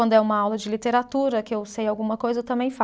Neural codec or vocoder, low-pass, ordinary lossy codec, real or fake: none; none; none; real